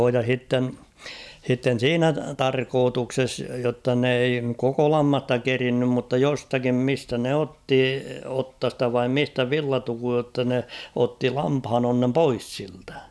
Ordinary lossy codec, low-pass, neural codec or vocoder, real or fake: none; none; none; real